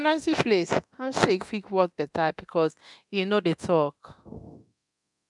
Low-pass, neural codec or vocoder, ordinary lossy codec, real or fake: 10.8 kHz; codec, 24 kHz, 0.9 kbps, DualCodec; none; fake